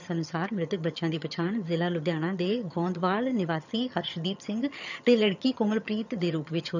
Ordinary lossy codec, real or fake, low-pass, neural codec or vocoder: none; fake; 7.2 kHz; vocoder, 22.05 kHz, 80 mel bands, HiFi-GAN